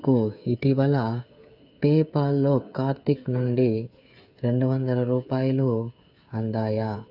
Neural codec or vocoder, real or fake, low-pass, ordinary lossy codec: codec, 16 kHz, 8 kbps, FreqCodec, smaller model; fake; 5.4 kHz; none